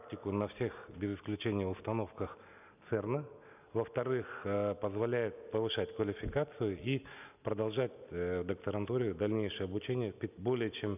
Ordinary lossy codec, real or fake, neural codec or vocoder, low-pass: none; real; none; 3.6 kHz